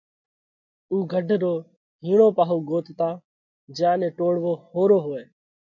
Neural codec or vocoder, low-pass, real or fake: none; 7.2 kHz; real